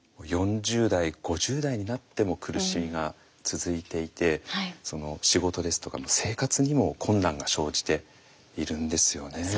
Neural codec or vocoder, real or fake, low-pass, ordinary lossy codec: none; real; none; none